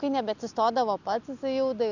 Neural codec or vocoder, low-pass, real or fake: none; 7.2 kHz; real